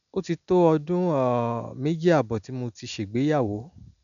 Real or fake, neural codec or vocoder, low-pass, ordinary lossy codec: real; none; 7.2 kHz; none